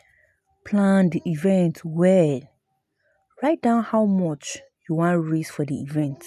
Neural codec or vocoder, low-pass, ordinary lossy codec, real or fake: none; 14.4 kHz; none; real